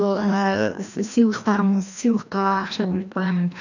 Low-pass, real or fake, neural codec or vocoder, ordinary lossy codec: 7.2 kHz; fake; codec, 16 kHz, 1 kbps, FreqCodec, larger model; none